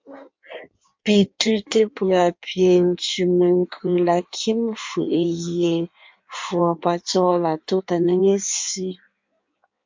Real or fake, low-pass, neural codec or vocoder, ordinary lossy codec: fake; 7.2 kHz; codec, 16 kHz in and 24 kHz out, 1.1 kbps, FireRedTTS-2 codec; MP3, 48 kbps